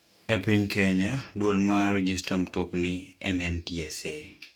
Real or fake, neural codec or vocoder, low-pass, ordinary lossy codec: fake; codec, 44.1 kHz, 2.6 kbps, DAC; 19.8 kHz; none